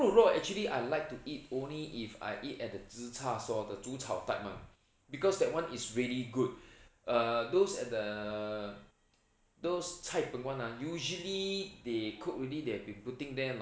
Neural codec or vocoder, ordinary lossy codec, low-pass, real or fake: none; none; none; real